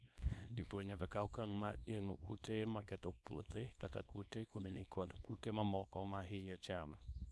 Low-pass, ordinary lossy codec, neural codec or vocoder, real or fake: none; none; codec, 24 kHz, 0.9 kbps, WavTokenizer, small release; fake